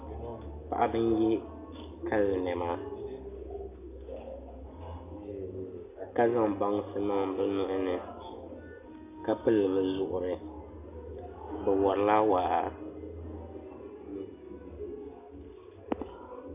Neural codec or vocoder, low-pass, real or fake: none; 3.6 kHz; real